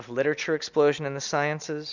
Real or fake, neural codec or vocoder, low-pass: real; none; 7.2 kHz